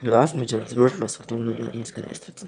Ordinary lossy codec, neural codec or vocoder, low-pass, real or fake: none; autoencoder, 22.05 kHz, a latent of 192 numbers a frame, VITS, trained on one speaker; 9.9 kHz; fake